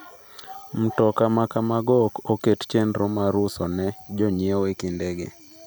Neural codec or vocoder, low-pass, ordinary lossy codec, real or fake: none; none; none; real